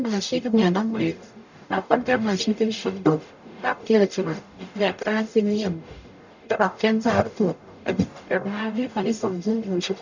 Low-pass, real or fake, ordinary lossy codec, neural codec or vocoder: 7.2 kHz; fake; none; codec, 44.1 kHz, 0.9 kbps, DAC